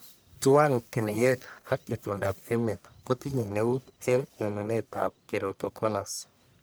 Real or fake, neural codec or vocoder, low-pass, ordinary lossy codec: fake; codec, 44.1 kHz, 1.7 kbps, Pupu-Codec; none; none